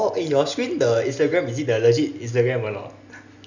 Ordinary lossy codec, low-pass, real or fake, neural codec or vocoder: none; 7.2 kHz; real; none